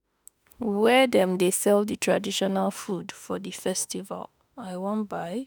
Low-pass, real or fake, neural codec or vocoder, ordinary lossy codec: none; fake; autoencoder, 48 kHz, 32 numbers a frame, DAC-VAE, trained on Japanese speech; none